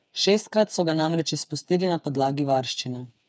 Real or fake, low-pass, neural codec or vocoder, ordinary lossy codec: fake; none; codec, 16 kHz, 4 kbps, FreqCodec, smaller model; none